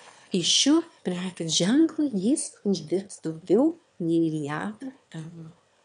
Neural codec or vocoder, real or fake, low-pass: autoencoder, 22.05 kHz, a latent of 192 numbers a frame, VITS, trained on one speaker; fake; 9.9 kHz